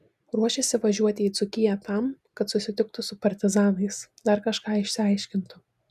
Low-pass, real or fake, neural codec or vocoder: 14.4 kHz; real; none